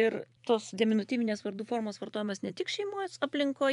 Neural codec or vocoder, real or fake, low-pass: vocoder, 24 kHz, 100 mel bands, Vocos; fake; 10.8 kHz